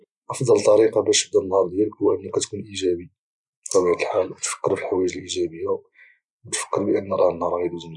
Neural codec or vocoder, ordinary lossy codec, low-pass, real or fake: none; none; 10.8 kHz; real